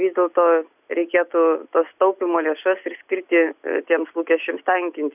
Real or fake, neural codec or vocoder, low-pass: real; none; 3.6 kHz